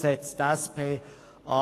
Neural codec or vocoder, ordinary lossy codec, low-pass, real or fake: codec, 44.1 kHz, 7.8 kbps, DAC; AAC, 48 kbps; 14.4 kHz; fake